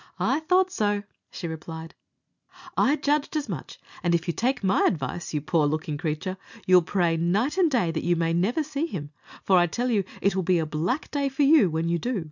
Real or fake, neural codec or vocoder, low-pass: real; none; 7.2 kHz